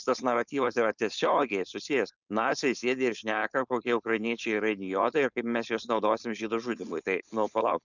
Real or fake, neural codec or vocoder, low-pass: fake; codec, 16 kHz, 4.8 kbps, FACodec; 7.2 kHz